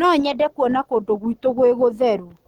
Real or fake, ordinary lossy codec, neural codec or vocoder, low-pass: real; Opus, 24 kbps; none; 19.8 kHz